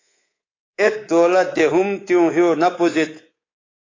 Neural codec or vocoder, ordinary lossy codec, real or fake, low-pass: codec, 24 kHz, 3.1 kbps, DualCodec; AAC, 32 kbps; fake; 7.2 kHz